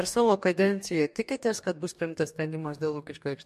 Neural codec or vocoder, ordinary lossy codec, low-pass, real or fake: codec, 44.1 kHz, 2.6 kbps, DAC; MP3, 64 kbps; 14.4 kHz; fake